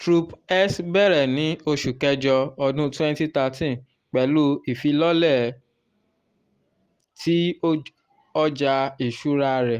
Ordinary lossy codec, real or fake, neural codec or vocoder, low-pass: Opus, 24 kbps; real; none; 14.4 kHz